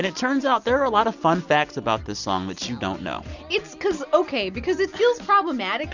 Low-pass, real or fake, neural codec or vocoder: 7.2 kHz; real; none